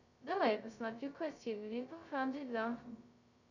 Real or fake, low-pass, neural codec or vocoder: fake; 7.2 kHz; codec, 16 kHz, 0.2 kbps, FocalCodec